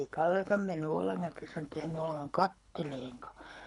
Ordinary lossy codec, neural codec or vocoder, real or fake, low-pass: none; codec, 24 kHz, 3 kbps, HILCodec; fake; 10.8 kHz